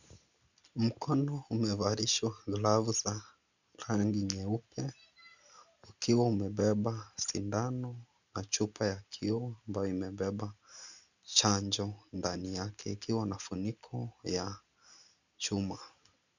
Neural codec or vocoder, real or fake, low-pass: none; real; 7.2 kHz